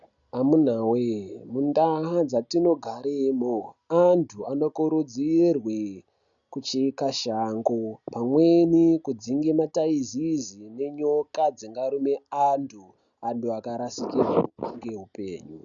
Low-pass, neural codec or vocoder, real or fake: 7.2 kHz; none; real